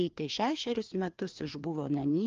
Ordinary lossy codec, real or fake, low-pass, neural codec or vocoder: Opus, 32 kbps; fake; 7.2 kHz; codec, 16 kHz, 2 kbps, FreqCodec, larger model